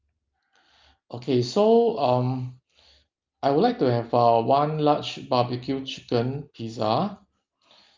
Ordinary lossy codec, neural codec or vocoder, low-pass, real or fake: Opus, 24 kbps; none; 7.2 kHz; real